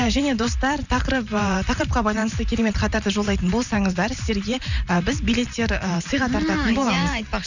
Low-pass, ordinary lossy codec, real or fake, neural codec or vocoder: 7.2 kHz; none; fake; vocoder, 44.1 kHz, 128 mel bands every 512 samples, BigVGAN v2